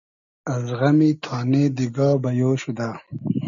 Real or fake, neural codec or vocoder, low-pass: real; none; 7.2 kHz